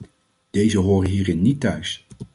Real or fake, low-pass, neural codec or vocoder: real; 10.8 kHz; none